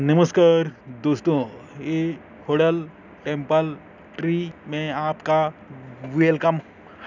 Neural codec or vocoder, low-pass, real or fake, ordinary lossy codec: none; 7.2 kHz; real; none